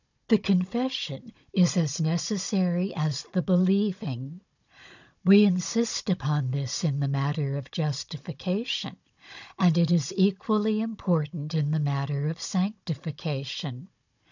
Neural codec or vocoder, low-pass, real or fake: codec, 16 kHz, 16 kbps, FunCodec, trained on Chinese and English, 50 frames a second; 7.2 kHz; fake